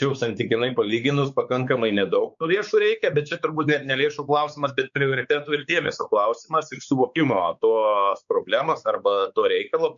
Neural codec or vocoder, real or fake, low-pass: codec, 16 kHz, 4 kbps, X-Codec, WavLM features, trained on Multilingual LibriSpeech; fake; 7.2 kHz